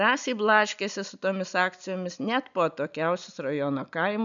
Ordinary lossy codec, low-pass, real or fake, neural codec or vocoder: MP3, 96 kbps; 7.2 kHz; real; none